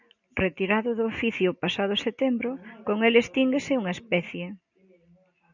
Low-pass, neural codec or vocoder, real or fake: 7.2 kHz; none; real